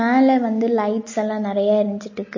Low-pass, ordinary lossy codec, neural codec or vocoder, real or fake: 7.2 kHz; MP3, 32 kbps; none; real